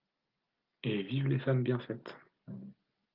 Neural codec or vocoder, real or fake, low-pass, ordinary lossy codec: none; real; 5.4 kHz; Opus, 24 kbps